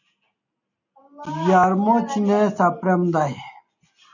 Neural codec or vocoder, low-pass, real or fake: none; 7.2 kHz; real